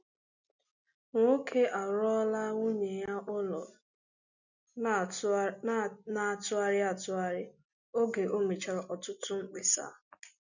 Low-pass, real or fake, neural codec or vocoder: 7.2 kHz; real; none